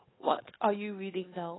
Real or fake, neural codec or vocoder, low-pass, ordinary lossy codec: fake; codec, 16 kHz, 2 kbps, FunCodec, trained on Chinese and English, 25 frames a second; 7.2 kHz; AAC, 16 kbps